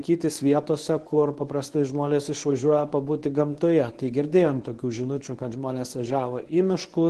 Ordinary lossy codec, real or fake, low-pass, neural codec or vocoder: Opus, 16 kbps; fake; 10.8 kHz; codec, 24 kHz, 0.9 kbps, WavTokenizer, medium speech release version 1